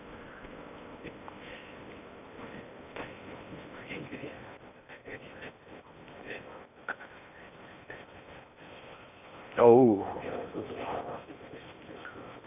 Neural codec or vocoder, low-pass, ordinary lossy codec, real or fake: codec, 16 kHz in and 24 kHz out, 0.8 kbps, FocalCodec, streaming, 65536 codes; 3.6 kHz; none; fake